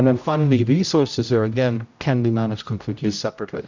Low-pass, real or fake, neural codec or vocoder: 7.2 kHz; fake; codec, 16 kHz, 0.5 kbps, X-Codec, HuBERT features, trained on general audio